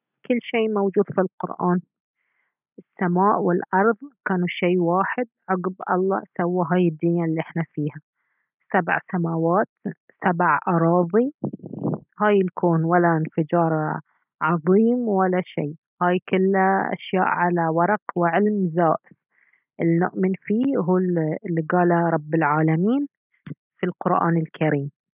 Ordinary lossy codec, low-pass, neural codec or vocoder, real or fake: none; 3.6 kHz; none; real